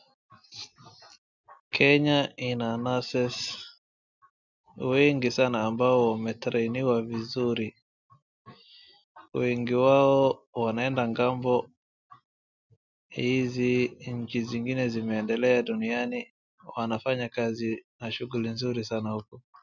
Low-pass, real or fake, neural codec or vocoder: 7.2 kHz; real; none